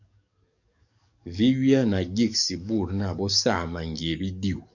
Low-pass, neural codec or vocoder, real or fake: 7.2 kHz; codec, 44.1 kHz, 7.8 kbps, DAC; fake